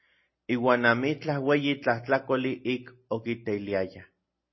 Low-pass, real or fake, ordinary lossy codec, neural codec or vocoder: 7.2 kHz; real; MP3, 24 kbps; none